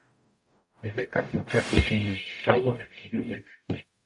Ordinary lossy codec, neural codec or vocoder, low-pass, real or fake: AAC, 64 kbps; codec, 44.1 kHz, 0.9 kbps, DAC; 10.8 kHz; fake